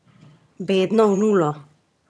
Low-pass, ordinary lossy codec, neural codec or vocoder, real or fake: none; none; vocoder, 22.05 kHz, 80 mel bands, HiFi-GAN; fake